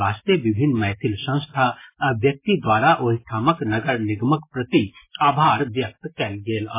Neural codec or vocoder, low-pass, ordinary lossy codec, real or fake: none; 3.6 kHz; MP3, 16 kbps; real